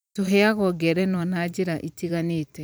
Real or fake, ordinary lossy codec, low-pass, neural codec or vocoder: fake; none; none; vocoder, 44.1 kHz, 128 mel bands every 256 samples, BigVGAN v2